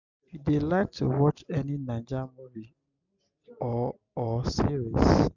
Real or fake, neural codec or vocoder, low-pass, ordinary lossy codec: real; none; 7.2 kHz; none